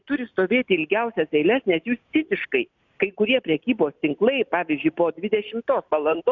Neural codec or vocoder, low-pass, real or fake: none; 7.2 kHz; real